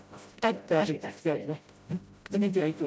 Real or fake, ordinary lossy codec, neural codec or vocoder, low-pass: fake; none; codec, 16 kHz, 0.5 kbps, FreqCodec, smaller model; none